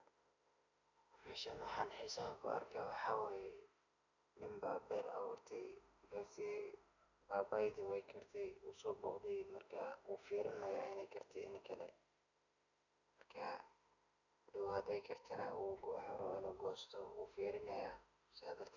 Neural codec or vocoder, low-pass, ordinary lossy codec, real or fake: autoencoder, 48 kHz, 32 numbers a frame, DAC-VAE, trained on Japanese speech; 7.2 kHz; none; fake